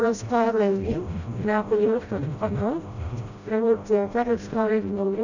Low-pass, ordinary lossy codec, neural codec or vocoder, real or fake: 7.2 kHz; none; codec, 16 kHz, 0.5 kbps, FreqCodec, smaller model; fake